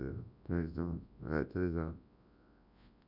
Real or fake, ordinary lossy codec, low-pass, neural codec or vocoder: fake; none; 5.4 kHz; codec, 24 kHz, 0.9 kbps, WavTokenizer, large speech release